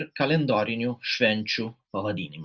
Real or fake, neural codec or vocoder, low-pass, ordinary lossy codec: real; none; 7.2 kHz; Opus, 64 kbps